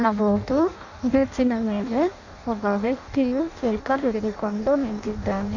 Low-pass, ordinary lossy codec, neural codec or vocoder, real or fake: 7.2 kHz; none; codec, 16 kHz in and 24 kHz out, 0.6 kbps, FireRedTTS-2 codec; fake